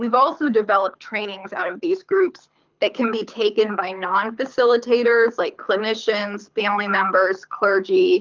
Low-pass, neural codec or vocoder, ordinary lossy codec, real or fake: 7.2 kHz; codec, 24 kHz, 6 kbps, HILCodec; Opus, 24 kbps; fake